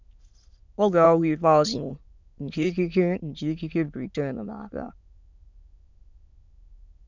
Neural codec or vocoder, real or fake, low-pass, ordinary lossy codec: autoencoder, 22.05 kHz, a latent of 192 numbers a frame, VITS, trained on many speakers; fake; 7.2 kHz; MP3, 64 kbps